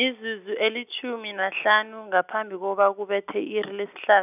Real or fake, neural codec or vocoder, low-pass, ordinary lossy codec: real; none; 3.6 kHz; none